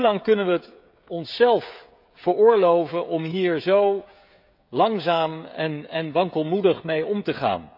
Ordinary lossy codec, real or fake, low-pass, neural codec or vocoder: none; fake; 5.4 kHz; codec, 16 kHz, 16 kbps, FreqCodec, smaller model